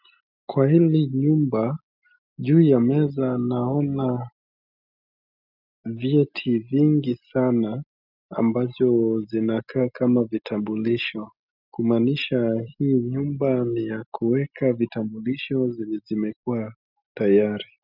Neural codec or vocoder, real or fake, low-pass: none; real; 5.4 kHz